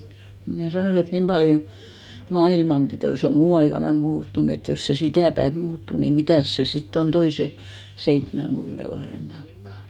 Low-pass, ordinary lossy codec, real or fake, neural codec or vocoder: 19.8 kHz; none; fake; codec, 44.1 kHz, 2.6 kbps, DAC